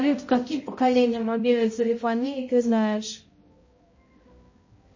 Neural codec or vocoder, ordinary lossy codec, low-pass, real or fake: codec, 16 kHz, 0.5 kbps, X-Codec, HuBERT features, trained on balanced general audio; MP3, 32 kbps; 7.2 kHz; fake